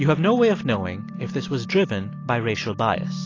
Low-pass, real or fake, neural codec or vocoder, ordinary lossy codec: 7.2 kHz; real; none; AAC, 32 kbps